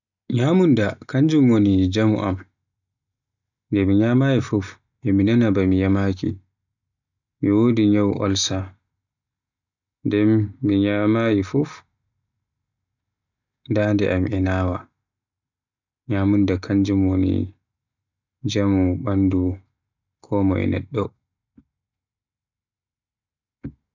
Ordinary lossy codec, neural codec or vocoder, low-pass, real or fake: none; none; 7.2 kHz; real